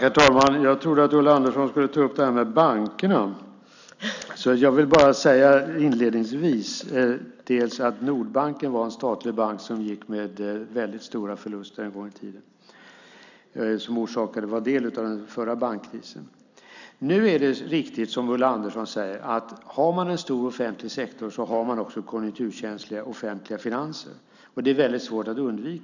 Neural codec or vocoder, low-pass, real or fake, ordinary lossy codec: none; 7.2 kHz; real; none